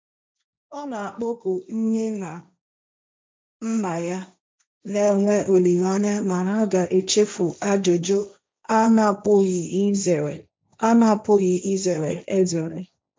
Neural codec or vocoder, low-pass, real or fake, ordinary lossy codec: codec, 16 kHz, 1.1 kbps, Voila-Tokenizer; none; fake; none